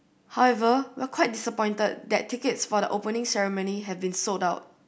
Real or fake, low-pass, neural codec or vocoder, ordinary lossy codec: real; none; none; none